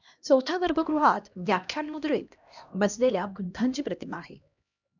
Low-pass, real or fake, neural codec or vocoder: 7.2 kHz; fake; codec, 16 kHz, 1 kbps, X-Codec, HuBERT features, trained on LibriSpeech